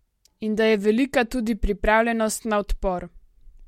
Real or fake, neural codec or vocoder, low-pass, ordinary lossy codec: real; none; 19.8 kHz; MP3, 64 kbps